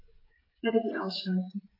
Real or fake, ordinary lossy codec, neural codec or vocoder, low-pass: fake; AAC, 24 kbps; codec, 16 kHz, 16 kbps, FreqCodec, smaller model; 5.4 kHz